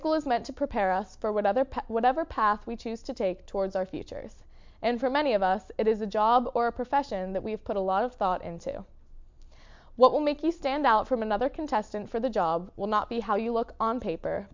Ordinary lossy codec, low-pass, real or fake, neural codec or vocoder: MP3, 64 kbps; 7.2 kHz; real; none